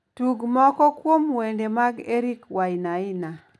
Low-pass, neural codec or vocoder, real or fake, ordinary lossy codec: none; none; real; none